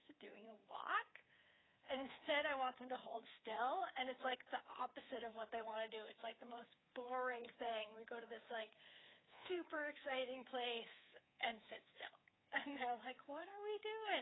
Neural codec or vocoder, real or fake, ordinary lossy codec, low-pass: codec, 24 kHz, 3.1 kbps, DualCodec; fake; AAC, 16 kbps; 7.2 kHz